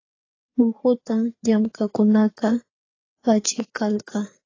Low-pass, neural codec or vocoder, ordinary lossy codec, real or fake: 7.2 kHz; codec, 16 kHz, 4 kbps, FreqCodec, larger model; AAC, 32 kbps; fake